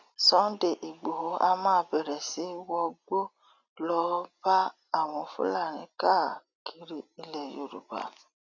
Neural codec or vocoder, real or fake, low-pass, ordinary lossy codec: none; real; 7.2 kHz; none